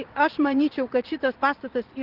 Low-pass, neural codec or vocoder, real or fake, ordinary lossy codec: 5.4 kHz; vocoder, 44.1 kHz, 80 mel bands, Vocos; fake; Opus, 16 kbps